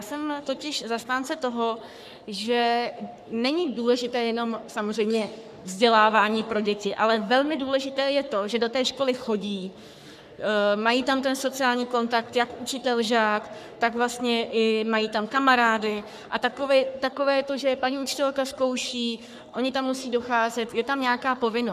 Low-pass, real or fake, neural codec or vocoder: 14.4 kHz; fake; codec, 44.1 kHz, 3.4 kbps, Pupu-Codec